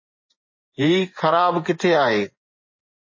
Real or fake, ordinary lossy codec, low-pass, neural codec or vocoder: fake; MP3, 32 kbps; 7.2 kHz; vocoder, 24 kHz, 100 mel bands, Vocos